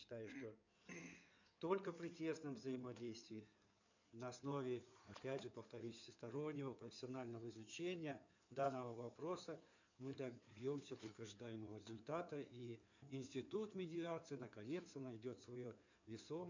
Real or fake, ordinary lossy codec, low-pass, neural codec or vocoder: fake; none; 7.2 kHz; codec, 16 kHz in and 24 kHz out, 2.2 kbps, FireRedTTS-2 codec